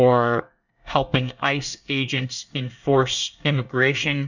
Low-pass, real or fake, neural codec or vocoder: 7.2 kHz; fake; codec, 24 kHz, 1 kbps, SNAC